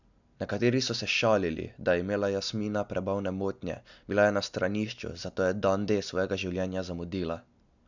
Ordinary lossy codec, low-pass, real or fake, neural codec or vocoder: none; 7.2 kHz; real; none